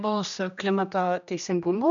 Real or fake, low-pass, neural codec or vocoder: fake; 7.2 kHz; codec, 16 kHz, 1 kbps, X-Codec, HuBERT features, trained on general audio